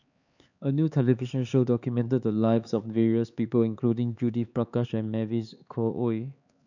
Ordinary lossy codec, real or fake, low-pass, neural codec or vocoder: none; fake; 7.2 kHz; codec, 16 kHz, 4 kbps, X-Codec, HuBERT features, trained on LibriSpeech